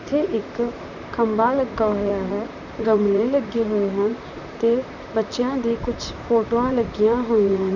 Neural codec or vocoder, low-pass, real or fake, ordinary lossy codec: vocoder, 44.1 kHz, 128 mel bands, Pupu-Vocoder; 7.2 kHz; fake; none